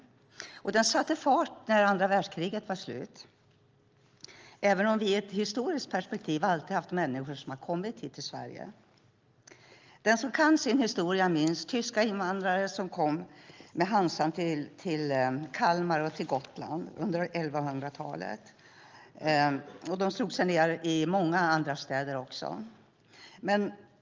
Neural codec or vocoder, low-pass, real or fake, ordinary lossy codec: none; 7.2 kHz; real; Opus, 24 kbps